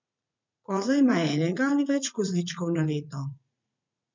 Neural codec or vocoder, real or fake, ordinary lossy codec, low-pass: vocoder, 44.1 kHz, 80 mel bands, Vocos; fake; MP3, 64 kbps; 7.2 kHz